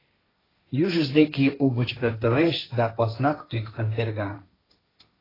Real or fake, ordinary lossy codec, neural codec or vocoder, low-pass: fake; AAC, 24 kbps; codec, 16 kHz, 1.1 kbps, Voila-Tokenizer; 5.4 kHz